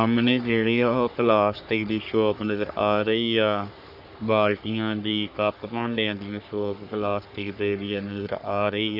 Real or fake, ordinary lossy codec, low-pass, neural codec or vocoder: fake; none; 5.4 kHz; codec, 44.1 kHz, 3.4 kbps, Pupu-Codec